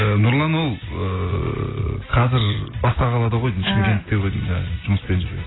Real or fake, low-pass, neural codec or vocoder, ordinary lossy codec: real; 7.2 kHz; none; AAC, 16 kbps